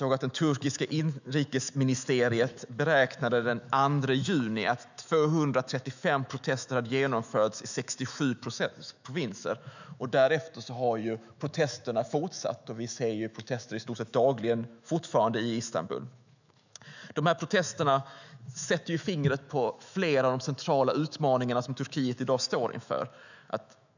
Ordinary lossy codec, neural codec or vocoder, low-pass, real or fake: none; autoencoder, 48 kHz, 128 numbers a frame, DAC-VAE, trained on Japanese speech; 7.2 kHz; fake